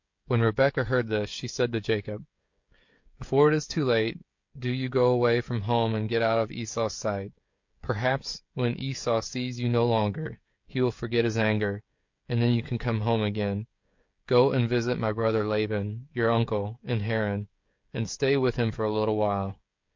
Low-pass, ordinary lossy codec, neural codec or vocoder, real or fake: 7.2 kHz; MP3, 48 kbps; codec, 16 kHz, 16 kbps, FreqCodec, smaller model; fake